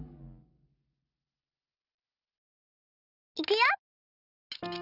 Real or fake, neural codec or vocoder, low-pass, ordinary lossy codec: real; none; 5.4 kHz; none